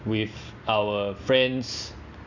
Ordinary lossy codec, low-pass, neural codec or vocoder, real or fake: none; 7.2 kHz; none; real